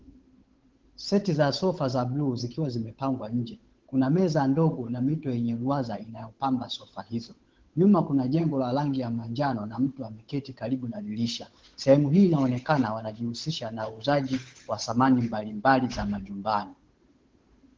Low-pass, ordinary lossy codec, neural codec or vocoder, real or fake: 7.2 kHz; Opus, 16 kbps; codec, 16 kHz, 8 kbps, FunCodec, trained on Chinese and English, 25 frames a second; fake